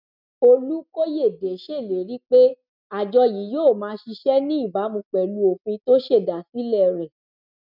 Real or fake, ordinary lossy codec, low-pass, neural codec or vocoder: real; none; 5.4 kHz; none